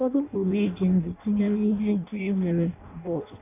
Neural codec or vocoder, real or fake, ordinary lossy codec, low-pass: codec, 16 kHz in and 24 kHz out, 0.6 kbps, FireRedTTS-2 codec; fake; none; 3.6 kHz